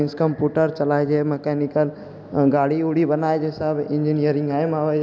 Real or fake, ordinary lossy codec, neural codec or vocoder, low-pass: real; none; none; none